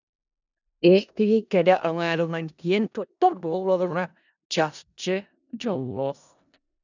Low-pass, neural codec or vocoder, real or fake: 7.2 kHz; codec, 16 kHz in and 24 kHz out, 0.4 kbps, LongCat-Audio-Codec, four codebook decoder; fake